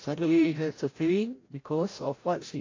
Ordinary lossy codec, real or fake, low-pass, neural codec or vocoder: AAC, 32 kbps; fake; 7.2 kHz; codec, 16 kHz, 0.5 kbps, FreqCodec, larger model